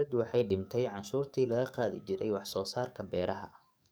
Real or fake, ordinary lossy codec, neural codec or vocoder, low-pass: fake; none; codec, 44.1 kHz, 7.8 kbps, DAC; none